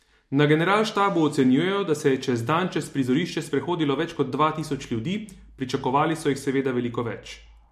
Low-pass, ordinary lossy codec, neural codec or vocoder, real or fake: 14.4 kHz; MP3, 64 kbps; none; real